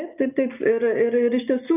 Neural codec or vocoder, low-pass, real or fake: none; 3.6 kHz; real